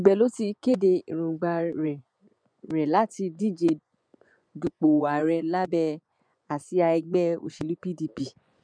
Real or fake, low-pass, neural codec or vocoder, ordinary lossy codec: fake; 9.9 kHz; vocoder, 24 kHz, 100 mel bands, Vocos; none